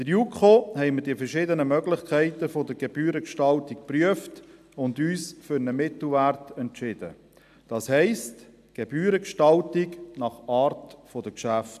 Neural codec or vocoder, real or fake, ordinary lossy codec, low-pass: none; real; AAC, 96 kbps; 14.4 kHz